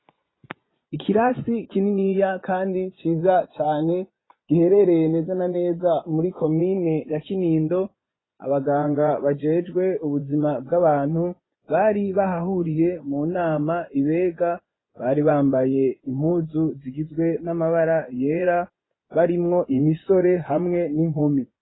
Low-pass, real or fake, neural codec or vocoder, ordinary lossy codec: 7.2 kHz; fake; vocoder, 24 kHz, 100 mel bands, Vocos; AAC, 16 kbps